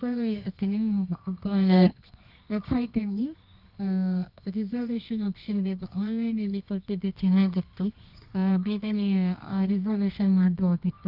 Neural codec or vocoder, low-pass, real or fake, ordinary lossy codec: codec, 24 kHz, 0.9 kbps, WavTokenizer, medium music audio release; 5.4 kHz; fake; none